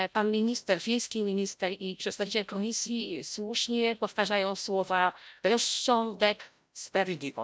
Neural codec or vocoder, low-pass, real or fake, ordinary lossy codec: codec, 16 kHz, 0.5 kbps, FreqCodec, larger model; none; fake; none